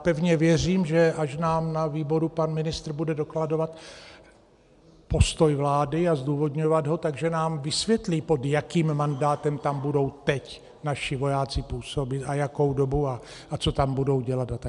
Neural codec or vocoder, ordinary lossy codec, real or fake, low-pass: none; MP3, 96 kbps; real; 10.8 kHz